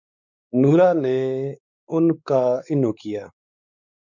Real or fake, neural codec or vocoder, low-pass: fake; codec, 16 kHz, 4 kbps, X-Codec, WavLM features, trained on Multilingual LibriSpeech; 7.2 kHz